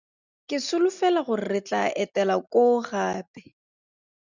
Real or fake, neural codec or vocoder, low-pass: real; none; 7.2 kHz